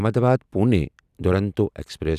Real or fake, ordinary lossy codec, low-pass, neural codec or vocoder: real; none; 14.4 kHz; none